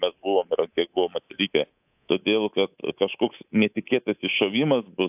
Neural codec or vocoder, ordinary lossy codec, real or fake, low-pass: codec, 24 kHz, 3.1 kbps, DualCodec; AAC, 32 kbps; fake; 3.6 kHz